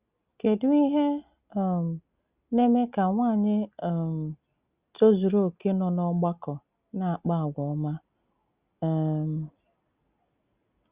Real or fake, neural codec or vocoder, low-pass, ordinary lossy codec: real; none; 3.6 kHz; Opus, 64 kbps